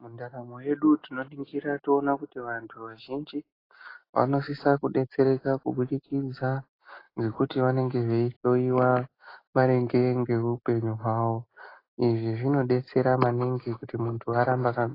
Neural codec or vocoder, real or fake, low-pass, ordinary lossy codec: none; real; 5.4 kHz; AAC, 24 kbps